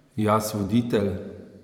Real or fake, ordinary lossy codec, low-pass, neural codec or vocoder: fake; none; 19.8 kHz; vocoder, 44.1 kHz, 128 mel bands every 512 samples, BigVGAN v2